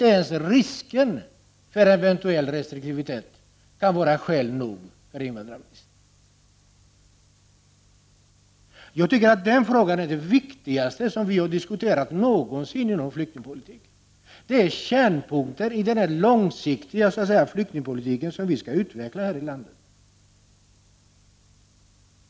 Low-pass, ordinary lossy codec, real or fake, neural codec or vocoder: none; none; real; none